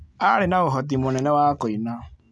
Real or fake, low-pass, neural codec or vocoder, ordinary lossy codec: real; none; none; none